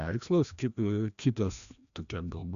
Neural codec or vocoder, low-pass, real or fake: codec, 16 kHz, 1 kbps, FreqCodec, larger model; 7.2 kHz; fake